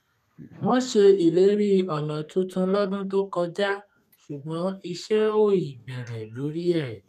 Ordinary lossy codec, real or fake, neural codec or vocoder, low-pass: none; fake; codec, 32 kHz, 1.9 kbps, SNAC; 14.4 kHz